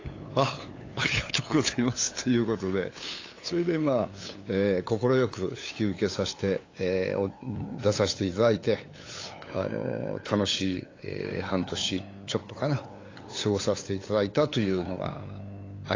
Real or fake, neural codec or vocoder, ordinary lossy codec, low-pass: fake; codec, 16 kHz, 8 kbps, FunCodec, trained on LibriTTS, 25 frames a second; AAC, 32 kbps; 7.2 kHz